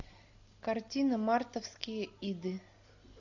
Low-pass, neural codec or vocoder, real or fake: 7.2 kHz; none; real